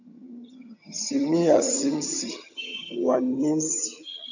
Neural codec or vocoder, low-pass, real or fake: vocoder, 22.05 kHz, 80 mel bands, HiFi-GAN; 7.2 kHz; fake